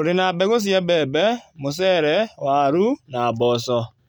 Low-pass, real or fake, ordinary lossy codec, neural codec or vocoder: 19.8 kHz; real; none; none